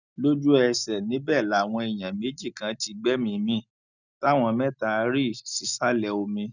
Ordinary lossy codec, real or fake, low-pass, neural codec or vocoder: none; real; 7.2 kHz; none